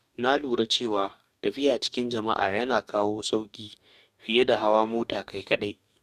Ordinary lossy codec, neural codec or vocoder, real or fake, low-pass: none; codec, 44.1 kHz, 2.6 kbps, DAC; fake; 14.4 kHz